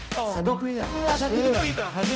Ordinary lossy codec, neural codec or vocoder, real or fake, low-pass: none; codec, 16 kHz, 0.5 kbps, X-Codec, HuBERT features, trained on balanced general audio; fake; none